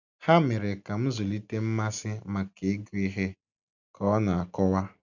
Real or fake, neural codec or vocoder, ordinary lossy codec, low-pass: real; none; none; 7.2 kHz